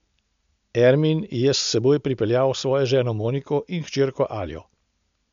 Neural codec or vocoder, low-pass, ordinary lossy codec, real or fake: none; 7.2 kHz; MP3, 64 kbps; real